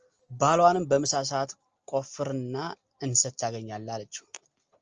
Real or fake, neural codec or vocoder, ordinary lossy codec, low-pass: real; none; Opus, 24 kbps; 7.2 kHz